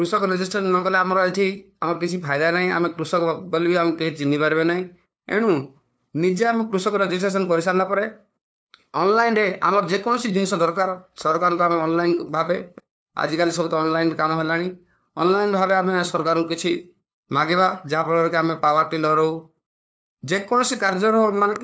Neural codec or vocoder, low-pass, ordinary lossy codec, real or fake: codec, 16 kHz, 2 kbps, FunCodec, trained on LibriTTS, 25 frames a second; none; none; fake